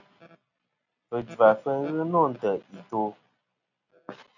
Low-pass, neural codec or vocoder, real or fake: 7.2 kHz; none; real